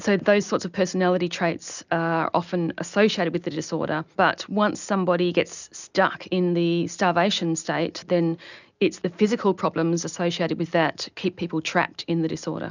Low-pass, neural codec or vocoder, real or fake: 7.2 kHz; none; real